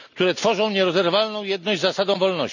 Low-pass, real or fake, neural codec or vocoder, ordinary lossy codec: 7.2 kHz; real; none; none